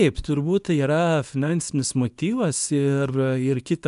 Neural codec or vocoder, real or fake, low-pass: codec, 24 kHz, 0.9 kbps, WavTokenizer, small release; fake; 10.8 kHz